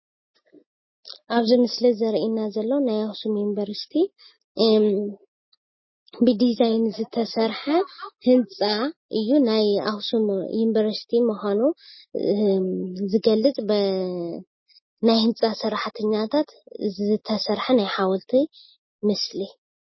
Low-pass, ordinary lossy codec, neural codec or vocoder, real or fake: 7.2 kHz; MP3, 24 kbps; none; real